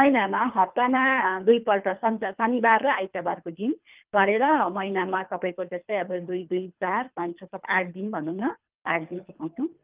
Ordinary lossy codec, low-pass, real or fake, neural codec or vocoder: Opus, 24 kbps; 3.6 kHz; fake; codec, 24 kHz, 3 kbps, HILCodec